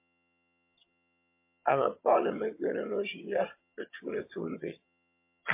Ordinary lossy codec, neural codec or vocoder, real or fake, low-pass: MP3, 24 kbps; vocoder, 22.05 kHz, 80 mel bands, HiFi-GAN; fake; 3.6 kHz